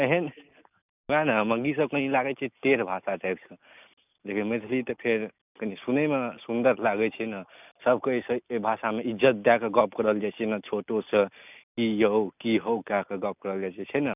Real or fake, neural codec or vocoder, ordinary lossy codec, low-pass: real; none; none; 3.6 kHz